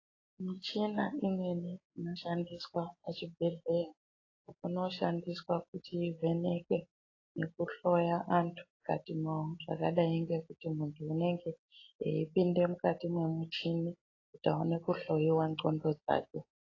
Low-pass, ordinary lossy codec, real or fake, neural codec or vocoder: 7.2 kHz; AAC, 32 kbps; real; none